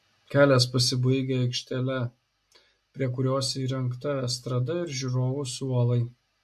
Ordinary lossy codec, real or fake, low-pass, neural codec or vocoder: MP3, 64 kbps; real; 14.4 kHz; none